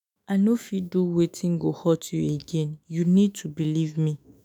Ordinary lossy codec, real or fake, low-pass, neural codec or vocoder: none; fake; 19.8 kHz; autoencoder, 48 kHz, 128 numbers a frame, DAC-VAE, trained on Japanese speech